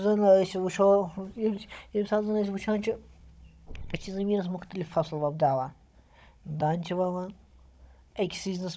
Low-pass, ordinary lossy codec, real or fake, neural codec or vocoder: none; none; fake; codec, 16 kHz, 16 kbps, FunCodec, trained on Chinese and English, 50 frames a second